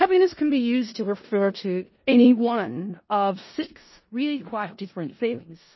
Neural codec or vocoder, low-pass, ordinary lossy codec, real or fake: codec, 16 kHz in and 24 kHz out, 0.4 kbps, LongCat-Audio-Codec, four codebook decoder; 7.2 kHz; MP3, 24 kbps; fake